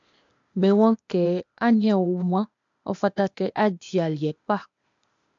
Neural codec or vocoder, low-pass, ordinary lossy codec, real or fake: codec, 16 kHz, 0.8 kbps, ZipCodec; 7.2 kHz; MP3, 64 kbps; fake